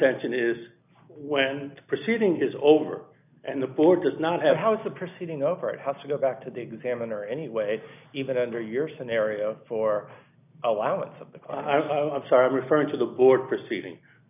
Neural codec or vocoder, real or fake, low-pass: none; real; 3.6 kHz